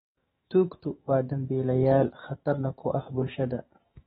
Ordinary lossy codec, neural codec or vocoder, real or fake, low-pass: AAC, 16 kbps; autoencoder, 48 kHz, 128 numbers a frame, DAC-VAE, trained on Japanese speech; fake; 19.8 kHz